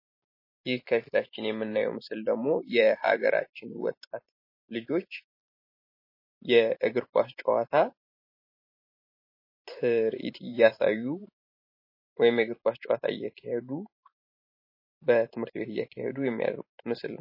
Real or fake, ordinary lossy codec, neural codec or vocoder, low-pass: fake; MP3, 24 kbps; vocoder, 44.1 kHz, 128 mel bands every 512 samples, BigVGAN v2; 5.4 kHz